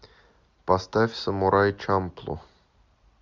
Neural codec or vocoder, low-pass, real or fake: none; 7.2 kHz; real